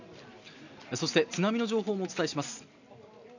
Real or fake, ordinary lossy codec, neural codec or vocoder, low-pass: real; none; none; 7.2 kHz